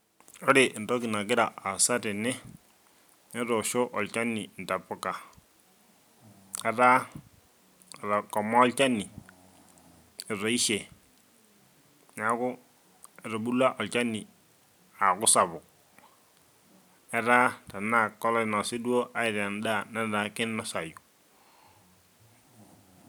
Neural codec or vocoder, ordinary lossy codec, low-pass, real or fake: none; none; none; real